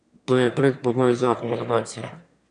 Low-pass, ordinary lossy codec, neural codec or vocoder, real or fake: 9.9 kHz; none; autoencoder, 22.05 kHz, a latent of 192 numbers a frame, VITS, trained on one speaker; fake